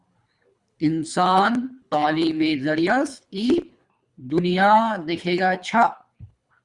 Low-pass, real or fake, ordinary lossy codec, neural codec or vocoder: 10.8 kHz; fake; Opus, 64 kbps; codec, 24 kHz, 3 kbps, HILCodec